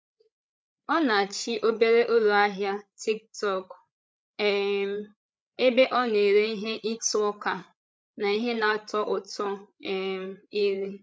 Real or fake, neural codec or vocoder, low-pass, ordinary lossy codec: fake; codec, 16 kHz, 8 kbps, FreqCodec, larger model; none; none